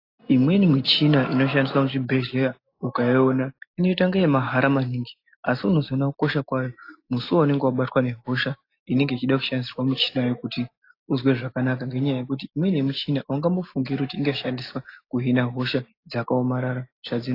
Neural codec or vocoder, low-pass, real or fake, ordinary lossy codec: none; 5.4 kHz; real; AAC, 32 kbps